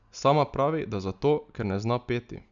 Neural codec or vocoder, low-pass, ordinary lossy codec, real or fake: none; 7.2 kHz; none; real